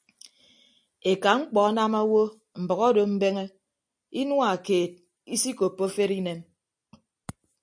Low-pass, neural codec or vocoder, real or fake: 9.9 kHz; none; real